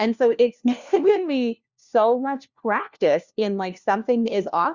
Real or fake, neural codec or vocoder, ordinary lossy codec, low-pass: fake; codec, 16 kHz, 1 kbps, FunCodec, trained on LibriTTS, 50 frames a second; Opus, 64 kbps; 7.2 kHz